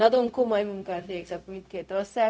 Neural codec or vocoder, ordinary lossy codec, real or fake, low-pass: codec, 16 kHz, 0.4 kbps, LongCat-Audio-Codec; none; fake; none